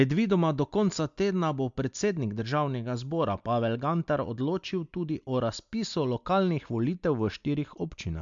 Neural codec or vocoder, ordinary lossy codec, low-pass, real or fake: none; MP3, 64 kbps; 7.2 kHz; real